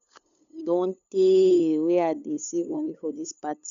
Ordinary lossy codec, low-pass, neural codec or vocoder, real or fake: none; 7.2 kHz; codec, 16 kHz, 8 kbps, FunCodec, trained on LibriTTS, 25 frames a second; fake